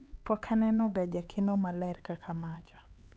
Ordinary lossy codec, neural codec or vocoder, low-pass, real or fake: none; codec, 16 kHz, 4 kbps, X-Codec, HuBERT features, trained on LibriSpeech; none; fake